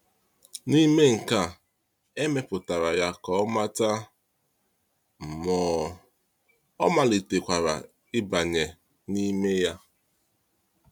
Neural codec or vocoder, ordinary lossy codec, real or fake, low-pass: none; none; real; 19.8 kHz